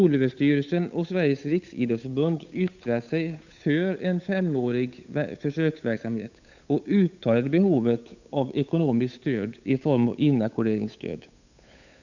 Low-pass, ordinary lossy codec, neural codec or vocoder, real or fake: 7.2 kHz; none; codec, 16 kHz, 8 kbps, FunCodec, trained on Chinese and English, 25 frames a second; fake